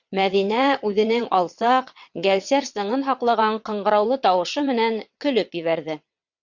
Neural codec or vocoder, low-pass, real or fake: vocoder, 22.05 kHz, 80 mel bands, WaveNeXt; 7.2 kHz; fake